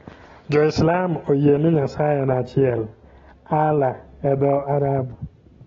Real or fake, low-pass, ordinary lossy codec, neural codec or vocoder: real; 7.2 kHz; AAC, 24 kbps; none